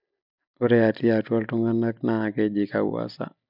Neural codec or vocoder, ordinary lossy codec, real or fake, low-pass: none; none; real; 5.4 kHz